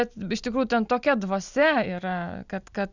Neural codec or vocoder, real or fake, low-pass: none; real; 7.2 kHz